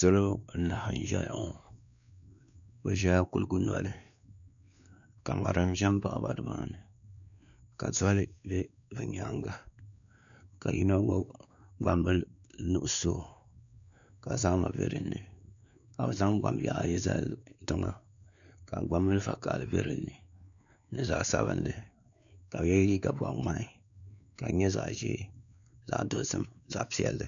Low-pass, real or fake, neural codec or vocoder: 7.2 kHz; fake; codec, 16 kHz, 2 kbps, X-Codec, WavLM features, trained on Multilingual LibriSpeech